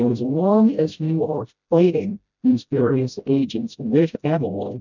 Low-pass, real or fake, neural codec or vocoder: 7.2 kHz; fake; codec, 16 kHz, 0.5 kbps, FreqCodec, smaller model